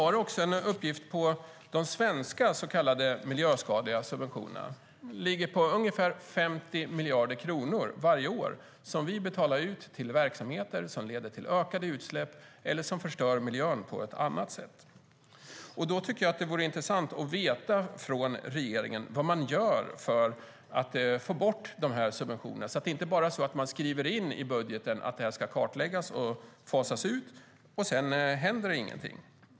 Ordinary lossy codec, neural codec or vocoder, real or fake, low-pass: none; none; real; none